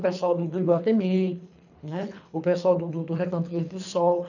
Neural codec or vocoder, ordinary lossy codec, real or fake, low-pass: codec, 24 kHz, 3 kbps, HILCodec; none; fake; 7.2 kHz